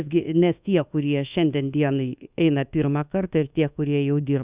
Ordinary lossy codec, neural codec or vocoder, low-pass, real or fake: Opus, 64 kbps; codec, 24 kHz, 1.2 kbps, DualCodec; 3.6 kHz; fake